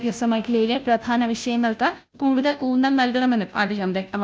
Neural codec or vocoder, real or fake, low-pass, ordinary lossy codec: codec, 16 kHz, 0.5 kbps, FunCodec, trained on Chinese and English, 25 frames a second; fake; none; none